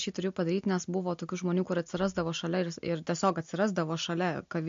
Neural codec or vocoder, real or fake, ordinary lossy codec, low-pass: none; real; MP3, 48 kbps; 7.2 kHz